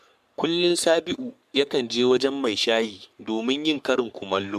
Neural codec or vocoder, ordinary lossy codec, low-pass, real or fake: codec, 44.1 kHz, 3.4 kbps, Pupu-Codec; MP3, 96 kbps; 14.4 kHz; fake